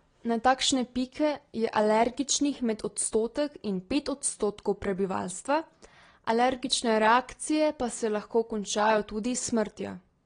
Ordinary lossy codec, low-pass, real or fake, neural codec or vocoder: AAC, 32 kbps; 9.9 kHz; real; none